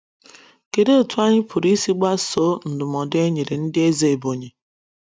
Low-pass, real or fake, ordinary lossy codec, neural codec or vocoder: none; real; none; none